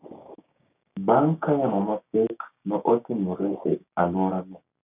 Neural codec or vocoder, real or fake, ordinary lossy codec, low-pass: codec, 16 kHz, 6 kbps, DAC; fake; none; 3.6 kHz